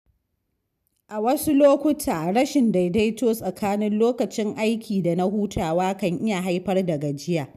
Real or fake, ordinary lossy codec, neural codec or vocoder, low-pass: real; none; none; 14.4 kHz